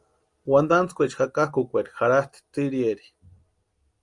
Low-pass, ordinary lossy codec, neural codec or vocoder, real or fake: 10.8 kHz; Opus, 32 kbps; none; real